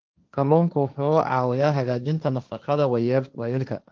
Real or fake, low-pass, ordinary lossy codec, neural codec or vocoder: fake; 7.2 kHz; Opus, 32 kbps; codec, 16 kHz, 1.1 kbps, Voila-Tokenizer